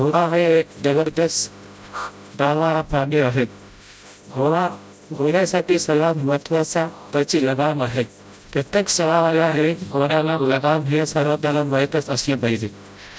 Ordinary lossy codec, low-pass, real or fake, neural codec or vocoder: none; none; fake; codec, 16 kHz, 0.5 kbps, FreqCodec, smaller model